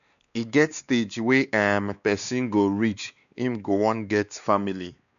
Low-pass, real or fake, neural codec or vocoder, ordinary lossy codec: 7.2 kHz; fake; codec, 16 kHz, 2 kbps, X-Codec, WavLM features, trained on Multilingual LibriSpeech; none